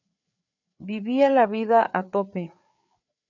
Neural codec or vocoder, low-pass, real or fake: codec, 16 kHz, 4 kbps, FreqCodec, larger model; 7.2 kHz; fake